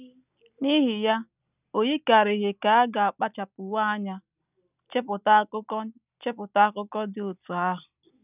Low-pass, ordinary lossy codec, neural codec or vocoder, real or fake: 3.6 kHz; none; none; real